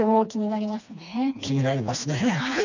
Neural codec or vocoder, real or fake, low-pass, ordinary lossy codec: codec, 16 kHz, 2 kbps, FreqCodec, smaller model; fake; 7.2 kHz; none